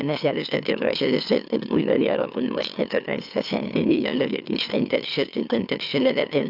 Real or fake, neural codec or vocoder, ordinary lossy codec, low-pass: fake; autoencoder, 44.1 kHz, a latent of 192 numbers a frame, MeloTTS; AAC, 48 kbps; 5.4 kHz